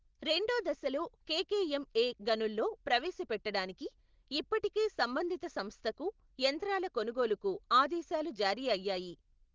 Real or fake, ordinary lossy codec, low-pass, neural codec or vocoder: real; Opus, 16 kbps; 7.2 kHz; none